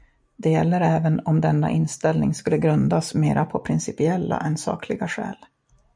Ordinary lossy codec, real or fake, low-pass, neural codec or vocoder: MP3, 96 kbps; real; 9.9 kHz; none